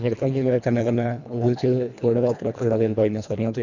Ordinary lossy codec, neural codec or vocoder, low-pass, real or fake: none; codec, 24 kHz, 1.5 kbps, HILCodec; 7.2 kHz; fake